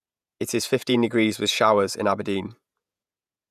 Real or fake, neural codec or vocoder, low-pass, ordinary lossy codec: fake; vocoder, 48 kHz, 128 mel bands, Vocos; 14.4 kHz; none